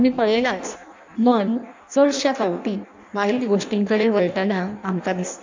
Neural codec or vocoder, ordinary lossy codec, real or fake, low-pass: codec, 16 kHz in and 24 kHz out, 0.6 kbps, FireRedTTS-2 codec; MP3, 64 kbps; fake; 7.2 kHz